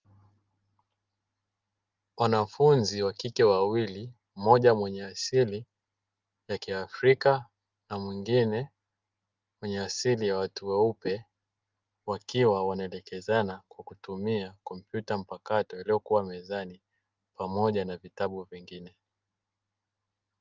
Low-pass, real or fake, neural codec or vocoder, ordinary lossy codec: 7.2 kHz; real; none; Opus, 32 kbps